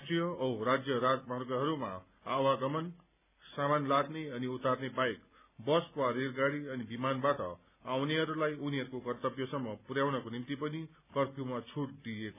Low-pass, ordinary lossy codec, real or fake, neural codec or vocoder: 3.6 kHz; none; real; none